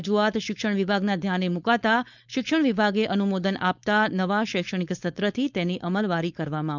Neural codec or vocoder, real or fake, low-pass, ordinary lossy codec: codec, 16 kHz, 4.8 kbps, FACodec; fake; 7.2 kHz; none